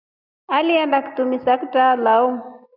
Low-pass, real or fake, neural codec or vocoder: 5.4 kHz; real; none